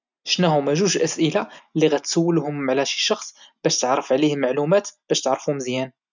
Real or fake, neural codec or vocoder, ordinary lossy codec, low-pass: real; none; none; 7.2 kHz